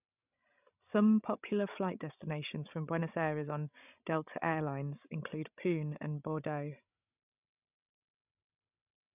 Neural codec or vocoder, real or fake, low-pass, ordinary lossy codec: codec, 16 kHz, 16 kbps, FreqCodec, larger model; fake; 3.6 kHz; none